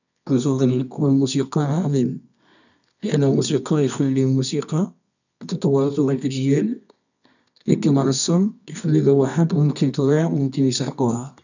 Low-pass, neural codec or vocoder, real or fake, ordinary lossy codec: 7.2 kHz; codec, 24 kHz, 0.9 kbps, WavTokenizer, medium music audio release; fake; none